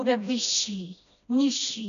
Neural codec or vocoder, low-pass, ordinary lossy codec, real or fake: codec, 16 kHz, 1 kbps, FreqCodec, smaller model; 7.2 kHz; AAC, 96 kbps; fake